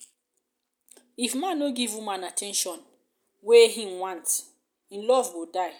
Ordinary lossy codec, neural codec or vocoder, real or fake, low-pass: none; none; real; none